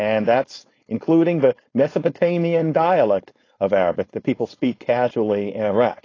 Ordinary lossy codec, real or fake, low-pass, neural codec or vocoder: AAC, 32 kbps; fake; 7.2 kHz; codec, 16 kHz, 4.8 kbps, FACodec